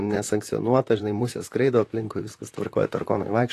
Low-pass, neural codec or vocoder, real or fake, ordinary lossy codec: 14.4 kHz; vocoder, 44.1 kHz, 128 mel bands, Pupu-Vocoder; fake; AAC, 48 kbps